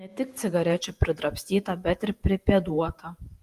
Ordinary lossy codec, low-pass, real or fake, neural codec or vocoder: Opus, 32 kbps; 14.4 kHz; fake; vocoder, 48 kHz, 128 mel bands, Vocos